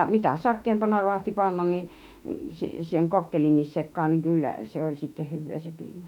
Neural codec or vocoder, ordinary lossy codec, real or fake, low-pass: autoencoder, 48 kHz, 32 numbers a frame, DAC-VAE, trained on Japanese speech; none; fake; 19.8 kHz